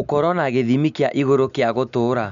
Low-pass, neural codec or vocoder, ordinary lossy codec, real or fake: 7.2 kHz; none; none; real